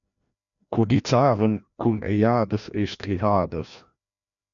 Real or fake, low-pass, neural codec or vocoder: fake; 7.2 kHz; codec, 16 kHz, 1 kbps, FreqCodec, larger model